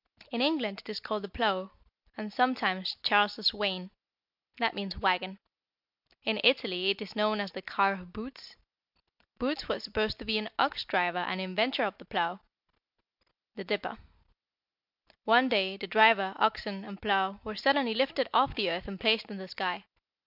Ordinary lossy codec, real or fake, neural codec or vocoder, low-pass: MP3, 48 kbps; real; none; 5.4 kHz